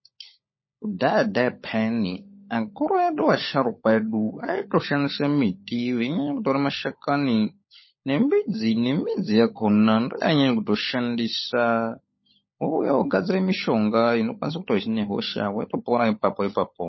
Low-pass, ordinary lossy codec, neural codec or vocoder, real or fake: 7.2 kHz; MP3, 24 kbps; codec, 16 kHz, 16 kbps, FunCodec, trained on LibriTTS, 50 frames a second; fake